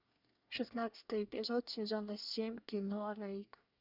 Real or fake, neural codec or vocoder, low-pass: fake; codec, 24 kHz, 1 kbps, SNAC; 5.4 kHz